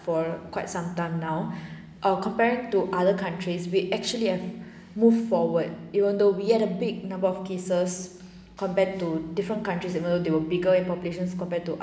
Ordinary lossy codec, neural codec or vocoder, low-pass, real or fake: none; none; none; real